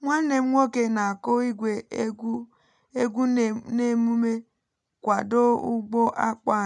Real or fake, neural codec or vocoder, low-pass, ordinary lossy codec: real; none; 10.8 kHz; none